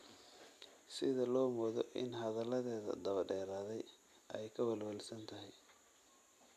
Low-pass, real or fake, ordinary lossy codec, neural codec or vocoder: 14.4 kHz; real; none; none